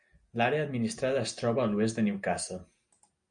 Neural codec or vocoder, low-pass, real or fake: none; 9.9 kHz; real